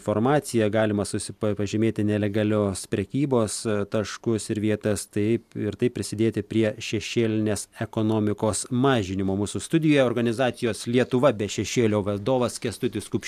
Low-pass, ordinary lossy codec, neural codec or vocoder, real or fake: 14.4 kHz; AAC, 96 kbps; none; real